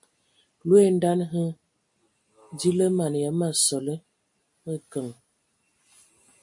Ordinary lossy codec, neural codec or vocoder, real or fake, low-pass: MP3, 48 kbps; none; real; 10.8 kHz